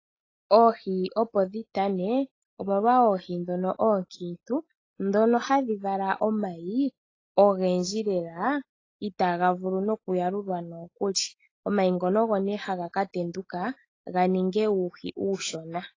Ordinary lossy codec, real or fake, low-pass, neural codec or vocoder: AAC, 32 kbps; real; 7.2 kHz; none